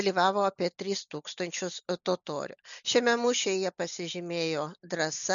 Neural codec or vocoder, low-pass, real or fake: none; 7.2 kHz; real